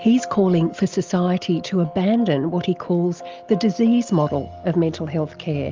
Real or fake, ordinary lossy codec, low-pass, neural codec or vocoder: real; Opus, 32 kbps; 7.2 kHz; none